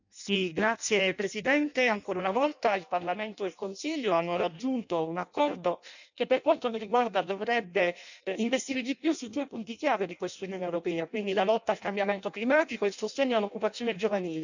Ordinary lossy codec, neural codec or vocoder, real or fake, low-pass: none; codec, 16 kHz in and 24 kHz out, 0.6 kbps, FireRedTTS-2 codec; fake; 7.2 kHz